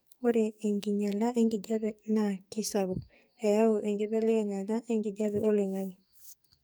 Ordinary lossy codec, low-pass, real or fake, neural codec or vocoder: none; none; fake; codec, 44.1 kHz, 2.6 kbps, SNAC